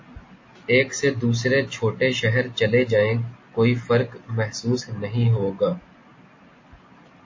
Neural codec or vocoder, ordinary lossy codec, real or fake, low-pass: none; MP3, 32 kbps; real; 7.2 kHz